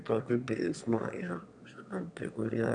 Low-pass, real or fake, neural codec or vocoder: 9.9 kHz; fake; autoencoder, 22.05 kHz, a latent of 192 numbers a frame, VITS, trained on one speaker